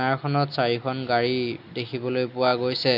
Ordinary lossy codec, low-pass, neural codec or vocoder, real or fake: none; 5.4 kHz; none; real